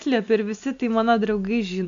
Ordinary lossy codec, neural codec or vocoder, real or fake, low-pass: MP3, 64 kbps; none; real; 7.2 kHz